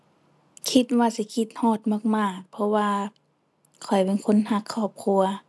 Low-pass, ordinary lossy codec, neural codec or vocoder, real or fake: none; none; none; real